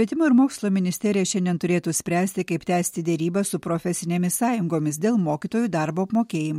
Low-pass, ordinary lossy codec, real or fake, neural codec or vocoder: 19.8 kHz; MP3, 64 kbps; real; none